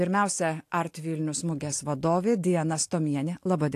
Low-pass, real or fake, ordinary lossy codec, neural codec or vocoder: 14.4 kHz; real; AAC, 64 kbps; none